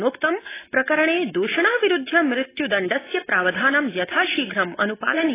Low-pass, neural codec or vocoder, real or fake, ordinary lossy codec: 3.6 kHz; none; real; AAC, 16 kbps